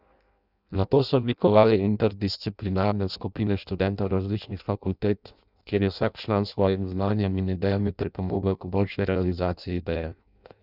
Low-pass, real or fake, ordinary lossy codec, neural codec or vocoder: 5.4 kHz; fake; none; codec, 16 kHz in and 24 kHz out, 0.6 kbps, FireRedTTS-2 codec